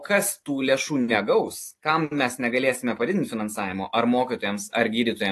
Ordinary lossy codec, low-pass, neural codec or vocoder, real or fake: AAC, 48 kbps; 14.4 kHz; none; real